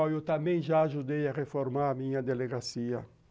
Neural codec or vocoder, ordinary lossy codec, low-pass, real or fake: none; none; none; real